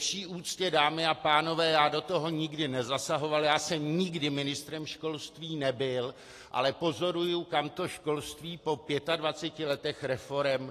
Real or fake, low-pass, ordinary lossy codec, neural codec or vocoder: real; 14.4 kHz; AAC, 48 kbps; none